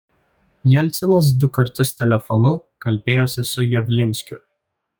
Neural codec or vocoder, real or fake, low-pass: codec, 44.1 kHz, 2.6 kbps, DAC; fake; 19.8 kHz